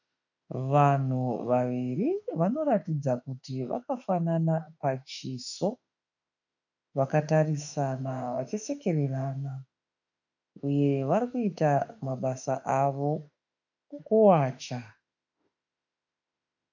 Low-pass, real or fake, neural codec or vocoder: 7.2 kHz; fake; autoencoder, 48 kHz, 32 numbers a frame, DAC-VAE, trained on Japanese speech